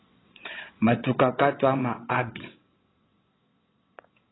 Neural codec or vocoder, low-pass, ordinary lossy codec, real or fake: vocoder, 22.05 kHz, 80 mel bands, WaveNeXt; 7.2 kHz; AAC, 16 kbps; fake